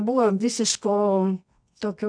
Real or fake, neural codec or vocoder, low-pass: fake; codec, 24 kHz, 0.9 kbps, WavTokenizer, medium music audio release; 9.9 kHz